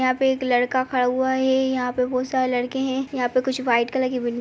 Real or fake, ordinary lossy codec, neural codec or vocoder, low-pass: real; none; none; none